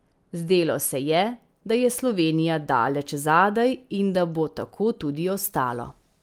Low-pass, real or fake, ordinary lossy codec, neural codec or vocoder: 19.8 kHz; real; Opus, 32 kbps; none